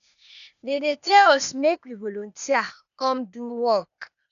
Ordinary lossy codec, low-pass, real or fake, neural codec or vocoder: none; 7.2 kHz; fake; codec, 16 kHz, 0.8 kbps, ZipCodec